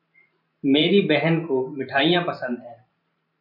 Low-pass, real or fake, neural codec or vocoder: 5.4 kHz; fake; vocoder, 44.1 kHz, 128 mel bands every 512 samples, BigVGAN v2